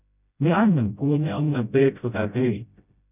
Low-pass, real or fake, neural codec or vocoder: 3.6 kHz; fake; codec, 16 kHz, 0.5 kbps, FreqCodec, smaller model